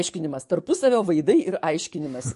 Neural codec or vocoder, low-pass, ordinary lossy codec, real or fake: codec, 44.1 kHz, 7.8 kbps, DAC; 14.4 kHz; MP3, 48 kbps; fake